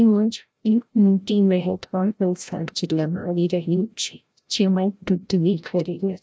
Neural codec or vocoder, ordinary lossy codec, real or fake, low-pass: codec, 16 kHz, 0.5 kbps, FreqCodec, larger model; none; fake; none